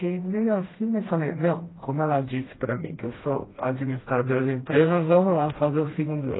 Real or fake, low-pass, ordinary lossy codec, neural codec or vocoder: fake; 7.2 kHz; AAC, 16 kbps; codec, 16 kHz, 1 kbps, FreqCodec, smaller model